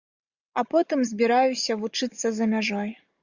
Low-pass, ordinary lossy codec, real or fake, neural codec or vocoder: 7.2 kHz; Opus, 64 kbps; real; none